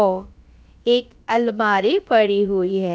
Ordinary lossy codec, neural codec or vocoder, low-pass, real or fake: none; codec, 16 kHz, about 1 kbps, DyCAST, with the encoder's durations; none; fake